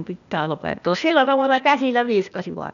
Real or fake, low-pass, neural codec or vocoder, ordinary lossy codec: fake; 7.2 kHz; codec, 16 kHz, 0.8 kbps, ZipCodec; none